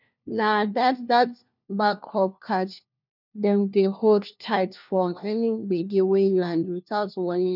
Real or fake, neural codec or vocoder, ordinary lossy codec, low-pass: fake; codec, 16 kHz, 1 kbps, FunCodec, trained on LibriTTS, 50 frames a second; none; 5.4 kHz